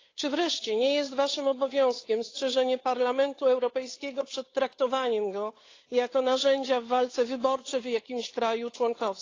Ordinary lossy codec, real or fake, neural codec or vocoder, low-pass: AAC, 32 kbps; fake; codec, 16 kHz, 8 kbps, FunCodec, trained on Chinese and English, 25 frames a second; 7.2 kHz